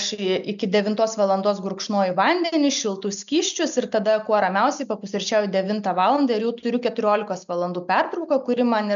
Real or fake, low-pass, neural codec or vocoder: real; 7.2 kHz; none